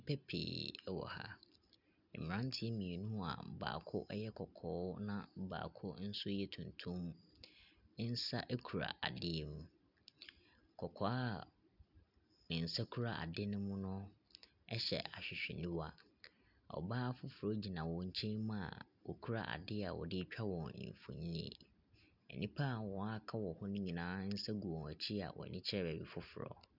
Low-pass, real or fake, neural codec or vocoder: 5.4 kHz; real; none